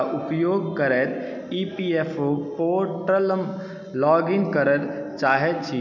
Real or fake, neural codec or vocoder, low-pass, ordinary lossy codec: real; none; 7.2 kHz; none